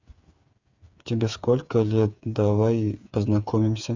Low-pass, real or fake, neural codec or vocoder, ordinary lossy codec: 7.2 kHz; fake; codec, 16 kHz, 8 kbps, FreqCodec, smaller model; Opus, 64 kbps